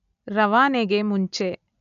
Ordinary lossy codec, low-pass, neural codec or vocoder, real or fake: none; 7.2 kHz; none; real